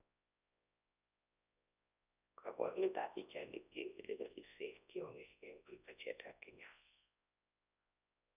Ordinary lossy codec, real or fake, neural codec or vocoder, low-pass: none; fake; codec, 24 kHz, 0.9 kbps, WavTokenizer, large speech release; 3.6 kHz